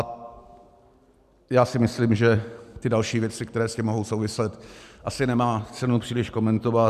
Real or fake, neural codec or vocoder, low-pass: real; none; 14.4 kHz